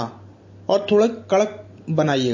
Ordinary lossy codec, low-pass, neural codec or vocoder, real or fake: MP3, 32 kbps; 7.2 kHz; none; real